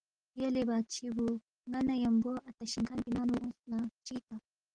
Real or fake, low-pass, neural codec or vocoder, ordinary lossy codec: real; 9.9 kHz; none; Opus, 16 kbps